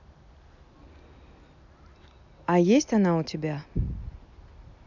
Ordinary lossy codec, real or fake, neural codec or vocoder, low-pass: none; real; none; 7.2 kHz